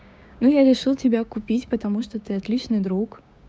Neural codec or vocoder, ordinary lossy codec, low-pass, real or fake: codec, 16 kHz, 6 kbps, DAC; none; none; fake